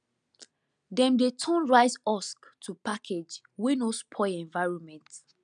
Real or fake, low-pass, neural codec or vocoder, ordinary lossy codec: real; 9.9 kHz; none; none